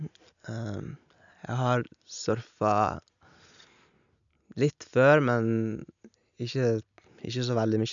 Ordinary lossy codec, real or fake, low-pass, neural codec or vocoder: none; real; 7.2 kHz; none